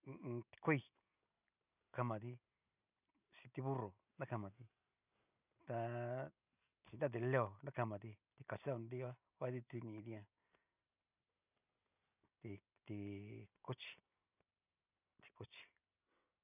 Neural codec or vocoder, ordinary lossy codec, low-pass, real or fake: none; none; 3.6 kHz; real